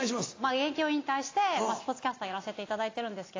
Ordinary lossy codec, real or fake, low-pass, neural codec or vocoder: AAC, 32 kbps; real; 7.2 kHz; none